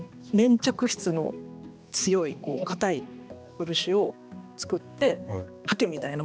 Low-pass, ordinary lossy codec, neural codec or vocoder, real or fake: none; none; codec, 16 kHz, 2 kbps, X-Codec, HuBERT features, trained on balanced general audio; fake